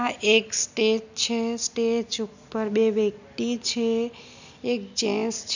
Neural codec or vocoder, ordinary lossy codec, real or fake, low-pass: none; none; real; 7.2 kHz